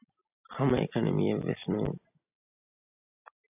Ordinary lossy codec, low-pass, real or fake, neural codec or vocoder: AAC, 32 kbps; 3.6 kHz; real; none